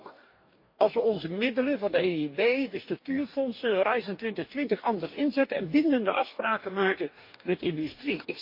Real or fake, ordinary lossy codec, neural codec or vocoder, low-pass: fake; MP3, 32 kbps; codec, 44.1 kHz, 2.6 kbps, DAC; 5.4 kHz